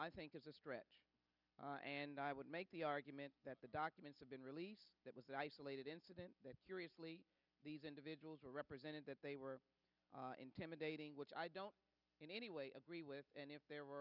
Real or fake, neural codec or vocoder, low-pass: real; none; 5.4 kHz